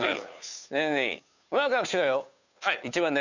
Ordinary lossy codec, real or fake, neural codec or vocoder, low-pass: none; fake; codec, 16 kHz, 2 kbps, FunCodec, trained on Chinese and English, 25 frames a second; 7.2 kHz